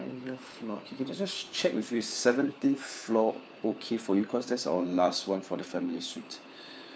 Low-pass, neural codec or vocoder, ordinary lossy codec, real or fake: none; codec, 16 kHz, 4 kbps, FunCodec, trained on LibriTTS, 50 frames a second; none; fake